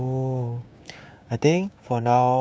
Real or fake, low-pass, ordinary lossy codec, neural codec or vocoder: fake; none; none; codec, 16 kHz, 6 kbps, DAC